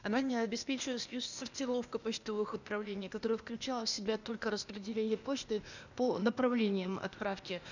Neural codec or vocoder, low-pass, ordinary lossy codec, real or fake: codec, 16 kHz, 0.8 kbps, ZipCodec; 7.2 kHz; none; fake